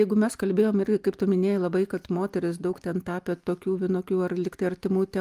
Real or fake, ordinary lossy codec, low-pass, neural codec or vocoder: real; Opus, 32 kbps; 14.4 kHz; none